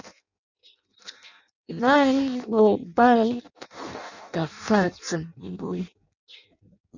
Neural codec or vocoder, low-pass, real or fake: codec, 16 kHz in and 24 kHz out, 0.6 kbps, FireRedTTS-2 codec; 7.2 kHz; fake